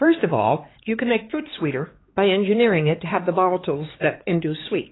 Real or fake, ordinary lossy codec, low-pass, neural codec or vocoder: fake; AAC, 16 kbps; 7.2 kHz; codec, 16 kHz, 4 kbps, X-Codec, HuBERT features, trained on LibriSpeech